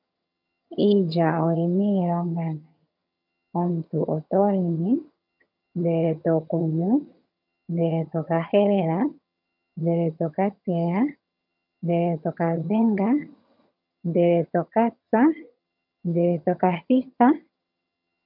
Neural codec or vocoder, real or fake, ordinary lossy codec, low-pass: vocoder, 22.05 kHz, 80 mel bands, HiFi-GAN; fake; MP3, 48 kbps; 5.4 kHz